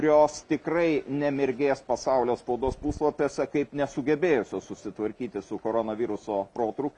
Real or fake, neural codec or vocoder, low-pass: real; none; 10.8 kHz